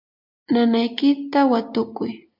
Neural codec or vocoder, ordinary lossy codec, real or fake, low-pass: none; MP3, 48 kbps; real; 5.4 kHz